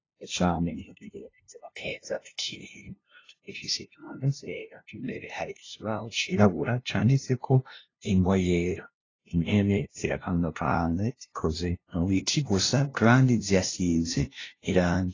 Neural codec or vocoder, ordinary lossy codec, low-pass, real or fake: codec, 16 kHz, 0.5 kbps, FunCodec, trained on LibriTTS, 25 frames a second; AAC, 32 kbps; 7.2 kHz; fake